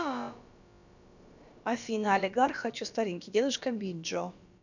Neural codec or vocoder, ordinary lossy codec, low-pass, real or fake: codec, 16 kHz, about 1 kbps, DyCAST, with the encoder's durations; none; 7.2 kHz; fake